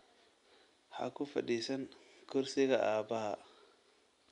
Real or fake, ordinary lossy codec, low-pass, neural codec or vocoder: real; none; 10.8 kHz; none